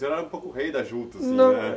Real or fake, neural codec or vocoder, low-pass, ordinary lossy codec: real; none; none; none